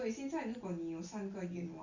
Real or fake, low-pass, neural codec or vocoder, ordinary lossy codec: real; 7.2 kHz; none; Opus, 64 kbps